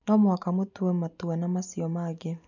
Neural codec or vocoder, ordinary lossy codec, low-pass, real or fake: none; none; 7.2 kHz; real